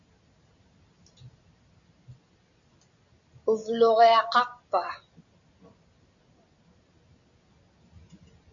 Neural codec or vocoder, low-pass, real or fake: none; 7.2 kHz; real